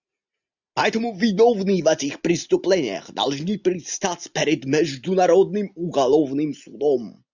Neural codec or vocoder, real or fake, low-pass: none; real; 7.2 kHz